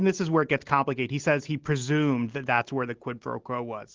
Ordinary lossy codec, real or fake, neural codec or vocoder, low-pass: Opus, 16 kbps; real; none; 7.2 kHz